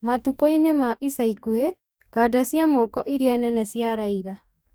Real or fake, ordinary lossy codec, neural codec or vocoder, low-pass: fake; none; codec, 44.1 kHz, 2.6 kbps, DAC; none